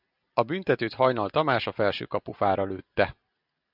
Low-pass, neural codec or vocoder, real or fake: 5.4 kHz; none; real